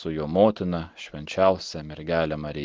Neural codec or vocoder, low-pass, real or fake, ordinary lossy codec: none; 7.2 kHz; real; Opus, 16 kbps